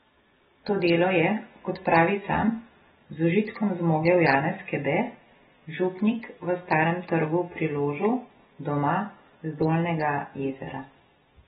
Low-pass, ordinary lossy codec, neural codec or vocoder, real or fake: 10.8 kHz; AAC, 16 kbps; none; real